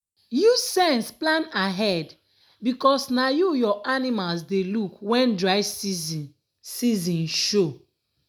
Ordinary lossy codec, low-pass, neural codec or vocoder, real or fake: none; none; none; real